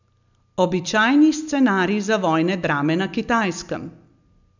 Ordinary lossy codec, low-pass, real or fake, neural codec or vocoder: none; 7.2 kHz; real; none